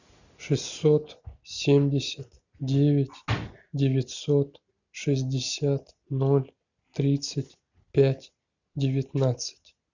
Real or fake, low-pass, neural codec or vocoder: real; 7.2 kHz; none